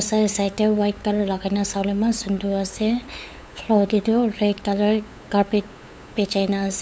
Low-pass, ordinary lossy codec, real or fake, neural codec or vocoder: none; none; fake; codec, 16 kHz, 8 kbps, FunCodec, trained on LibriTTS, 25 frames a second